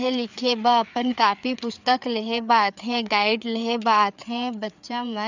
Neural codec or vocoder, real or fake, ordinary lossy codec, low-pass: codec, 16 kHz, 4 kbps, FreqCodec, larger model; fake; none; 7.2 kHz